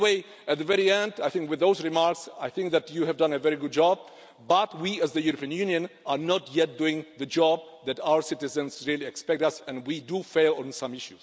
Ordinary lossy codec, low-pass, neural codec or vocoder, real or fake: none; none; none; real